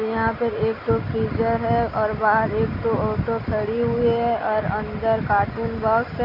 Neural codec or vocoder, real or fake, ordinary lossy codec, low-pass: none; real; none; 5.4 kHz